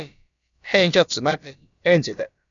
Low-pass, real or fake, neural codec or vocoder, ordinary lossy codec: 7.2 kHz; fake; codec, 16 kHz, about 1 kbps, DyCAST, with the encoder's durations; MP3, 96 kbps